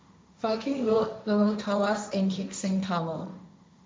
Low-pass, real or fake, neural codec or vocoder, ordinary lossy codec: none; fake; codec, 16 kHz, 1.1 kbps, Voila-Tokenizer; none